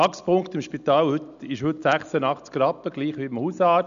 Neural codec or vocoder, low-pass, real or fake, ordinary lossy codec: none; 7.2 kHz; real; none